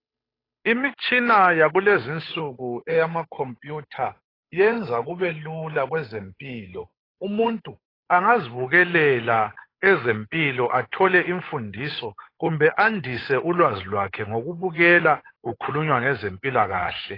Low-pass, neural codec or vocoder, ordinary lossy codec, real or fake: 5.4 kHz; codec, 16 kHz, 8 kbps, FunCodec, trained on Chinese and English, 25 frames a second; AAC, 24 kbps; fake